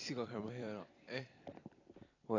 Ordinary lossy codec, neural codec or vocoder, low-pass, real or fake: AAC, 32 kbps; vocoder, 44.1 kHz, 128 mel bands every 256 samples, BigVGAN v2; 7.2 kHz; fake